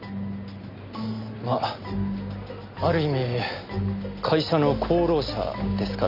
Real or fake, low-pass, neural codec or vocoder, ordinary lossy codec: real; 5.4 kHz; none; none